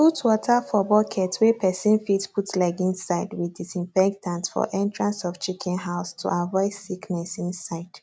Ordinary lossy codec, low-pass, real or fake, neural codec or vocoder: none; none; real; none